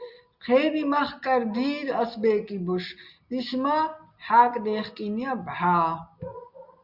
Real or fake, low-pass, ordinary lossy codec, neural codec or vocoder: real; 5.4 kHz; Opus, 64 kbps; none